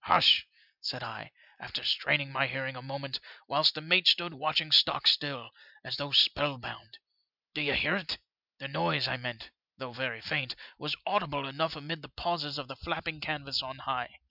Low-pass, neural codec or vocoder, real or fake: 5.4 kHz; none; real